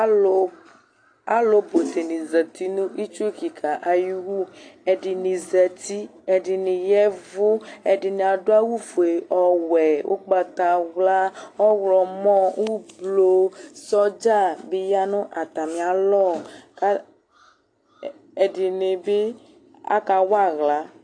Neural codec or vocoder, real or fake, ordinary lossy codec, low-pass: none; real; AAC, 48 kbps; 9.9 kHz